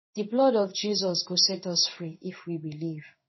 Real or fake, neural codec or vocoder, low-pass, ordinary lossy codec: fake; codec, 16 kHz in and 24 kHz out, 1 kbps, XY-Tokenizer; 7.2 kHz; MP3, 24 kbps